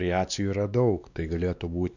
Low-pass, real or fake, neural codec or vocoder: 7.2 kHz; fake; codec, 16 kHz, 2 kbps, X-Codec, WavLM features, trained on Multilingual LibriSpeech